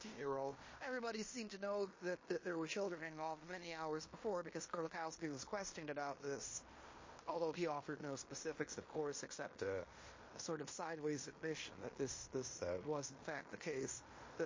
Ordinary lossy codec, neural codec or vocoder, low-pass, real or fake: MP3, 32 kbps; codec, 16 kHz in and 24 kHz out, 0.9 kbps, LongCat-Audio-Codec, fine tuned four codebook decoder; 7.2 kHz; fake